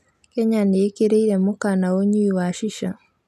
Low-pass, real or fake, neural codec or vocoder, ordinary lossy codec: 14.4 kHz; real; none; none